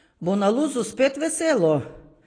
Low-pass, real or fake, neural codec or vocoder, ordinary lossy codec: 9.9 kHz; real; none; AAC, 32 kbps